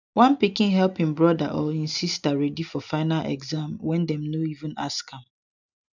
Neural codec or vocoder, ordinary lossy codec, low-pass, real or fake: none; none; 7.2 kHz; real